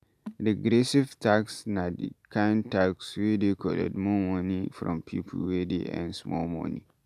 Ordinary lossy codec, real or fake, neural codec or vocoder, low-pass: MP3, 96 kbps; real; none; 14.4 kHz